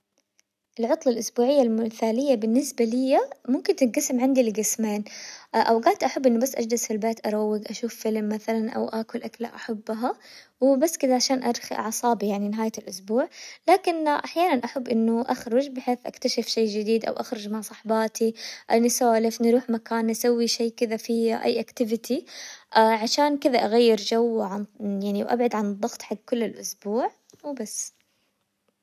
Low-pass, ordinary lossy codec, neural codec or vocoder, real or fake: 14.4 kHz; none; none; real